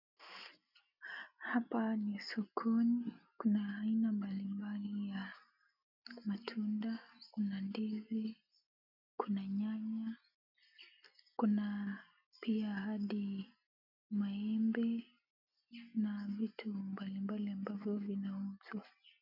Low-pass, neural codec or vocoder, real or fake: 5.4 kHz; none; real